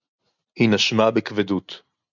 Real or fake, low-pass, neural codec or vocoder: real; 7.2 kHz; none